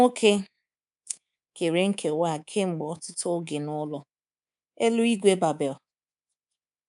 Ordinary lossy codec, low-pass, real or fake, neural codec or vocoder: none; 10.8 kHz; fake; codec, 24 kHz, 3.1 kbps, DualCodec